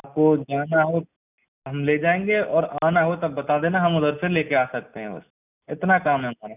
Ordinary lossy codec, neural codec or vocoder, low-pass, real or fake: none; none; 3.6 kHz; real